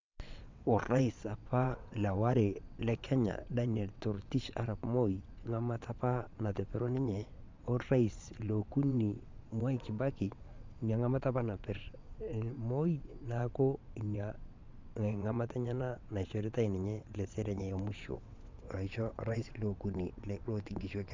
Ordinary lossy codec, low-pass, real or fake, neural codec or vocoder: MP3, 64 kbps; 7.2 kHz; fake; vocoder, 22.05 kHz, 80 mel bands, WaveNeXt